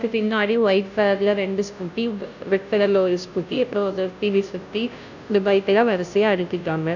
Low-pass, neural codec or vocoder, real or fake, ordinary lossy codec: 7.2 kHz; codec, 16 kHz, 0.5 kbps, FunCodec, trained on Chinese and English, 25 frames a second; fake; none